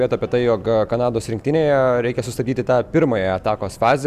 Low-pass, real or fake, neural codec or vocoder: 14.4 kHz; real; none